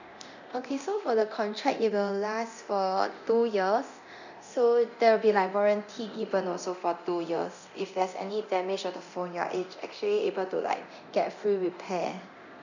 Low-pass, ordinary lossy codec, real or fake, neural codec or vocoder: 7.2 kHz; none; fake; codec, 24 kHz, 0.9 kbps, DualCodec